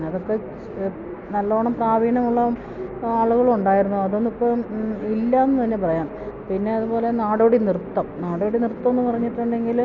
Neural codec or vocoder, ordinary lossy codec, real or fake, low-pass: none; none; real; 7.2 kHz